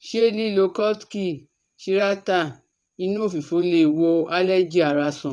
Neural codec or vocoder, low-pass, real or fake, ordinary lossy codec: vocoder, 22.05 kHz, 80 mel bands, WaveNeXt; none; fake; none